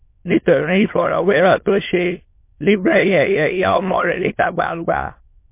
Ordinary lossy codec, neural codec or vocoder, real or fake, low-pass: MP3, 24 kbps; autoencoder, 22.05 kHz, a latent of 192 numbers a frame, VITS, trained on many speakers; fake; 3.6 kHz